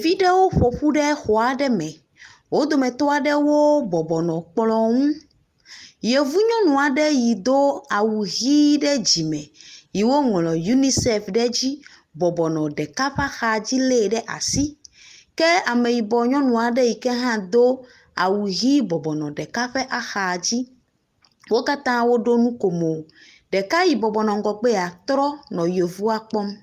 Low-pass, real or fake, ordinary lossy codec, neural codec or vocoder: 14.4 kHz; real; Opus, 32 kbps; none